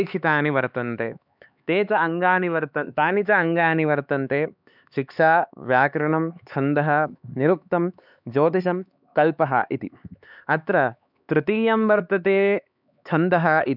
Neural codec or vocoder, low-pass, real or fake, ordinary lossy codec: codec, 16 kHz, 4 kbps, X-Codec, HuBERT features, trained on LibriSpeech; 5.4 kHz; fake; none